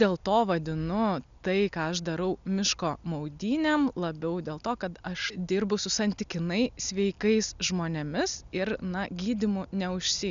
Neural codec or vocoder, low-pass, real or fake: none; 7.2 kHz; real